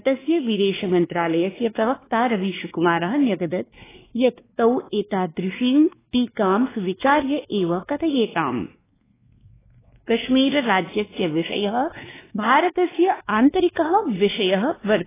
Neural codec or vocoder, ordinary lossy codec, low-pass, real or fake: codec, 16 kHz, 2 kbps, X-Codec, WavLM features, trained on Multilingual LibriSpeech; AAC, 16 kbps; 3.6 kHz; fake